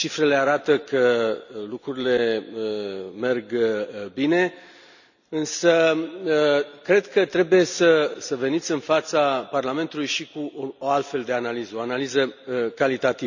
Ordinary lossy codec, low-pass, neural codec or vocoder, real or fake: none; 7.2 kHz; none; real